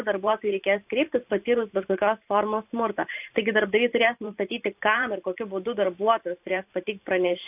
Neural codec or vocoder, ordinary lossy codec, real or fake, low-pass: vocoder, 44.1 kHz, 128 mel bands every 512 samples, BigVGAN v2; AAC, 32 kbps; fake; 3.6 kHz